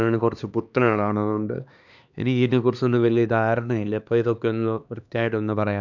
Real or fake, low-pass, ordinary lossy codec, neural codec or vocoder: fake; 7.2 kHz; none; codec, 16 kHz, 2 kbps, X-Codec, HuBERT features, trained on LibriSpeech